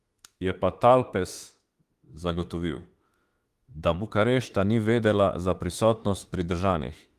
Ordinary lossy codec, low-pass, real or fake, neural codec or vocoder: Opus, 24 kbps; 14.4 kHz; fake; autoencoder, 48 kHz, 32 numbers a frame, DAC-VAE, trained on Japanese speech